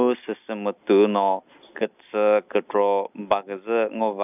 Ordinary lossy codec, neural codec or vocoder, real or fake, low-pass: none; none; real; 3.6 kHz